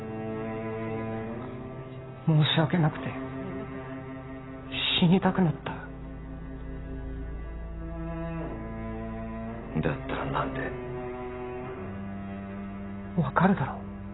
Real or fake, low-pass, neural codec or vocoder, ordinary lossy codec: real; 7.2 kHz; none; AAC, 16 kbps